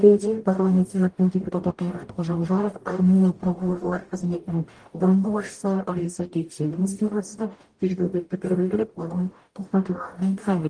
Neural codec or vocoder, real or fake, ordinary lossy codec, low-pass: codec, 44.1 kHz, 0.9 kbps, DAC; fake; Opus, 24 kbps; 9.9 kHz